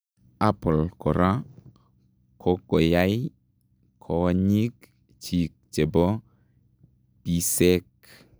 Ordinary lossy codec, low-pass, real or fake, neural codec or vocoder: none; none; real; none